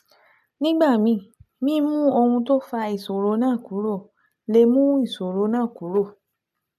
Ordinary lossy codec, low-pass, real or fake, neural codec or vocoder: none; 14.4 kHz; real; none